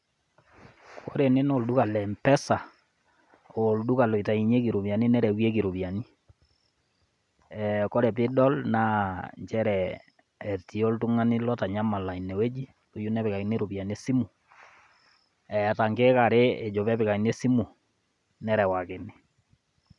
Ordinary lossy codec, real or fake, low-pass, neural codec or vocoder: none; real; 10.8 kHz; none